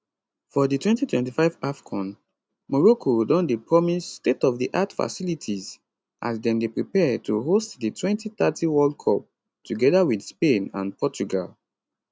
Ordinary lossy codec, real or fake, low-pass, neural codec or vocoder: none; real; none; none